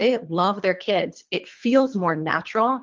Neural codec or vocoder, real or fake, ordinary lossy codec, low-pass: codec, 24 kHz, 3 kbps, HILCodec; fake; Opus, 32 kbps; 7.2 kHz